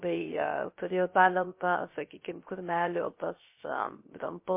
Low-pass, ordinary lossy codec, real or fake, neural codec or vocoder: 3.6 kHz; MP3, 24 kbps; fake; codec, 16 kHz, 0.3 kbps, FocalCodec